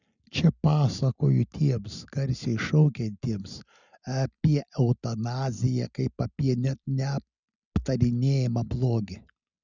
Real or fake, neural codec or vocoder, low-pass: real; none; 7.2 kHz